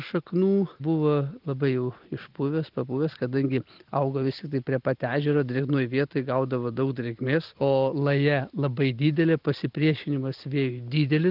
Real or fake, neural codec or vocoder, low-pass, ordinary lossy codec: real; none; 5.4 kHz; Opus, 24 kbps